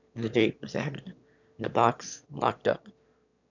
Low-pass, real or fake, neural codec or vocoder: 7.2 kHz; fake; autoencoder, 22.05 kHz, a latent of 192 numbers a frame, VITS, trained on one speaker